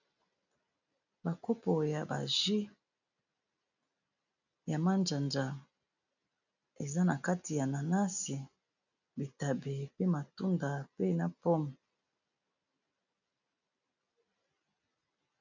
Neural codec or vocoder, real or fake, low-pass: none; real; 7.2 kHz